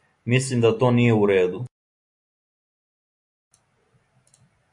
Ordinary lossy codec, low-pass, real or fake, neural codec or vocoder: AAC, 64 kbps; 10.8 kHz; real; none